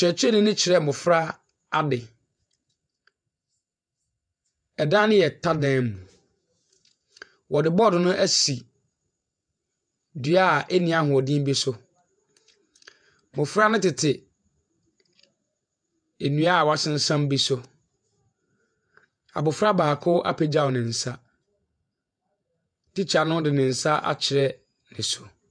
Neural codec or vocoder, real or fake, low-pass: vocoder, 48 kHz, 128 mel bands, Vocos; fake; 9.9 kHz